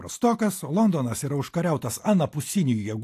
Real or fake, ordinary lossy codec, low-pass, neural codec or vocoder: real; AAC, 64 kbps; 14.4 kHz; none